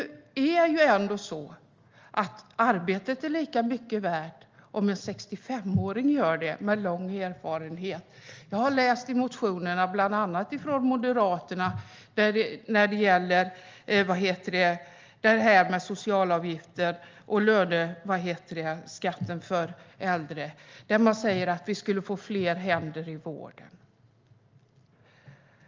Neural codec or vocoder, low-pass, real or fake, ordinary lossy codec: none; 7.2 kHz; real; Opus, 32 kbps